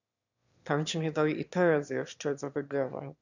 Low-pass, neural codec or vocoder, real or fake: 7.2 kHz; autoencoder, 22.05 kHz, a latent of 192 numbers a frame, VITS, trained on one speaker; fake